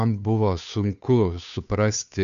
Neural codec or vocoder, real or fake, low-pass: codec, 16 kHz, 2 kbps, FunCodec, trained on Chinese and English, 25 frames a second; fake; 7.2 kHz